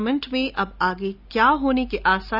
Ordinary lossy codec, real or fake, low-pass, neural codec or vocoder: none; real; 5.4 kHz; none